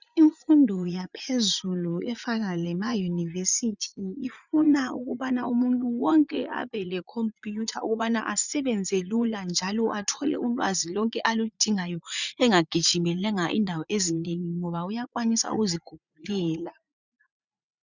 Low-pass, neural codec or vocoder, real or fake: 7.2 kHz; vocoder, 44.1 kHz, 80 mel bands, Vocos; fake